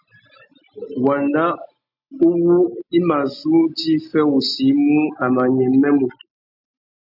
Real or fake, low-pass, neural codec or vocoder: real; 5.4 kHz; none